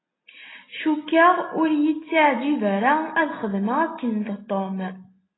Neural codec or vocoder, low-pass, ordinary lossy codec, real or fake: none; 7.2 kHz; AAC, 16 kbps; real